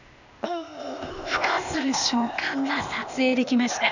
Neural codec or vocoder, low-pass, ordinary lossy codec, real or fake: codec, 16 kHz, 0.8 kbps, ZipCodec; 7.2 kHz; none; fake